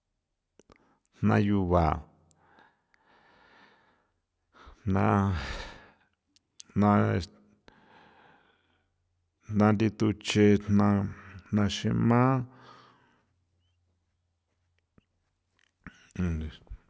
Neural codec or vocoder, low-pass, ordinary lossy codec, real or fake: none; none; none; real